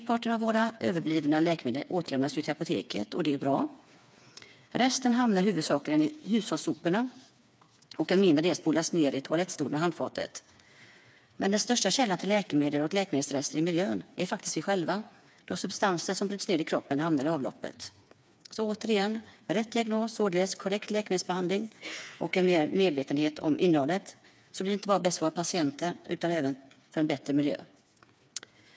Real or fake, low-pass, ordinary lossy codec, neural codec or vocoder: fake; none; none; codec, 16 kHz, 4 kbps, FreqCodec, smaller model